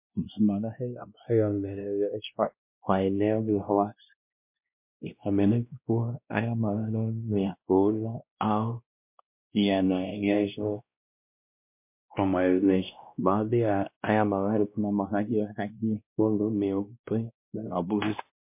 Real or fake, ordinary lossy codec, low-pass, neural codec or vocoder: fake; MP3, 32 kbps; 3.6 kHz; codec, 16 kHz, 1 kbps, X-Codec, WavLM features, trained on Multilingual LibriSpeech